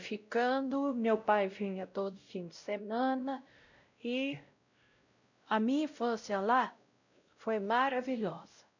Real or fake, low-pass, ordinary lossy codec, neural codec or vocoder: fake; 7.2 kHz; none; codec, 16 kHz, 0.5 kbps, X-Codec, WavLM features, trained on Multilingual LibriSpeech